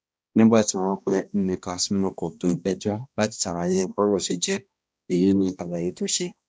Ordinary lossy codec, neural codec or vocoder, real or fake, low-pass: none; codec, 16 kHz, 1 kbps, X-Codec, HuBERT features, trained on balanced general audio; fake; none